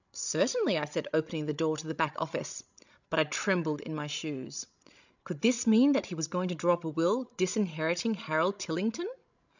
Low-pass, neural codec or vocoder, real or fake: 7.2 kHz; codec, 16 kHz, 16 kbps, FreqCodec, larger model; fake